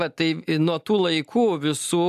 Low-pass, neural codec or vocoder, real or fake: 14.4 kHz; none; real